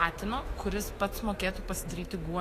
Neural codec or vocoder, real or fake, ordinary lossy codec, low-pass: autoencoder, 48 kHz, 128 numbers a frame, DAC-VAE, trained on Japanese speech; fake; AAC, 48 kbps; 14.4 kHz